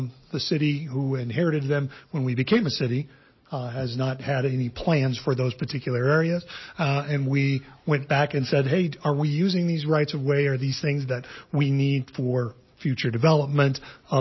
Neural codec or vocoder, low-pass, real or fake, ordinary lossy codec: none; 7.2 kHz; real; MP3, 24 kbps